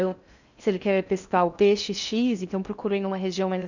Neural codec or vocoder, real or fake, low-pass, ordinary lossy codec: codec, 16 kHz in and 24 kHz out, 0.6 kbps, FocalCodec, streaming, 4096 codes; fake; 7.2 kHz; none